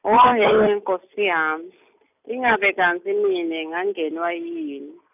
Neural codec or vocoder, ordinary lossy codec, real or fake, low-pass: none; none; real; 3.6 kHz